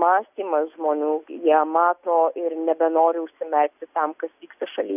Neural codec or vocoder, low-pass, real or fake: none; 3.6 kHz; real